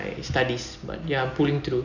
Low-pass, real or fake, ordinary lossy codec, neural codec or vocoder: 7.2 kHz; real; none; none